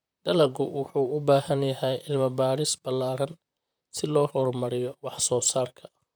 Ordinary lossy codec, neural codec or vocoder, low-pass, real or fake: none; vocoder, 44.1 kHz, 128 mel bands, Pupu-Vocoder; none; fake